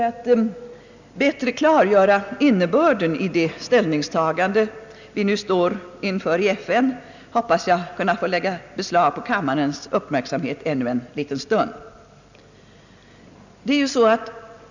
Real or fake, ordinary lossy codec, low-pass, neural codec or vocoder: real; none; 7.2 kHz; none